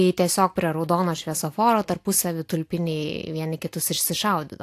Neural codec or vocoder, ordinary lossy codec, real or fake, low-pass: none; AAC, 64 kbps; real; 14.4 kHz